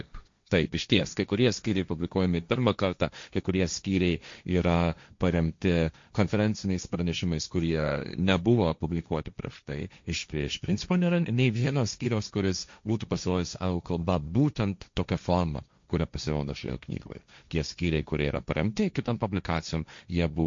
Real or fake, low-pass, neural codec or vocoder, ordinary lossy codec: fake; 7.2 kHz; codec, 16 kHz, 1.1 kbps, Voila-Tokenizer; MP3, 48 kbps